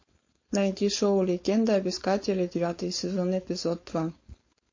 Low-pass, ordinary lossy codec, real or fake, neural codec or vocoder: 7.2 kHz; MP3, 32 kbps; fake; codec, 16 kHz, 4.8 kbps, FACodec